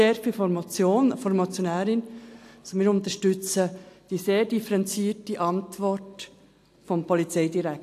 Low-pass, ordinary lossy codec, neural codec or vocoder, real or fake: 14.4 kHz; AAC, 64 kbps; none; real